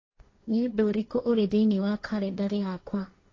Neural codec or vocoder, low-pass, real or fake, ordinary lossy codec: codec, 16 kHz, 1.1 kbps, Voila-Tokenizer; 7.2 kHz; fake; AAC, 32 kbps